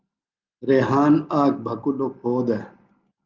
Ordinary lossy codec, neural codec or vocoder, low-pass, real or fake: Opus, 32 kbps; none; 7.2 kHz; real